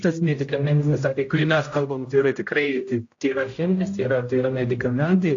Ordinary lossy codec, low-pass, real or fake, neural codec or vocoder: AAC, 48 kbps; 7.2 kHz; fake; codec, 16 kHz, 0.5 kbps, X-Codec, HuBERT features, trained on general audio